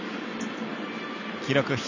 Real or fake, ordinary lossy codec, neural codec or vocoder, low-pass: real; none; none; 7.2 kHz